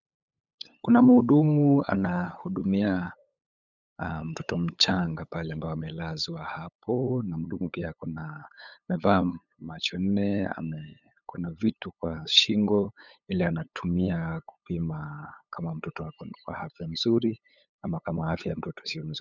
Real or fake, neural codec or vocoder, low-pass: fake; codec, 16 kHz, 8 kbps, FunCodec, trained on LibriTTS, 25 frames a second; 7.2 kHz